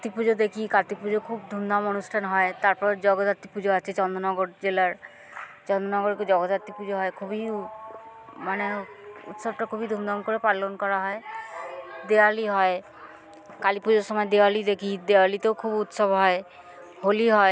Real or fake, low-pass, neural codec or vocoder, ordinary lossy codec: real; none; none; none